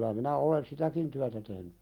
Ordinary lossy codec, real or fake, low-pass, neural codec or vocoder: Opus, 32 kbps; real; 19.8 kHz; none